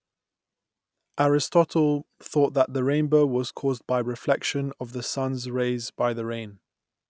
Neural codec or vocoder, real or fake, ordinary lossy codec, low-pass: none; real; none; none